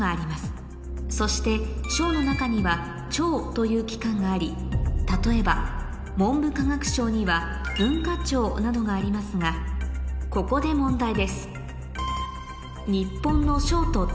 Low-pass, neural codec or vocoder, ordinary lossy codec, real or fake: none; none; none; real